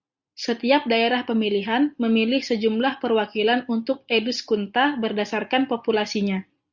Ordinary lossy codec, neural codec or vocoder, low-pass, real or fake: Opus, 64 kbps; none; 7.2 kHz; real